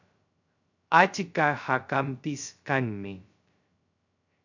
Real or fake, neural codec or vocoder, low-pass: fake; codec, 16 kHz, 0.2 kbps, FocalCodec; 7.2 kHz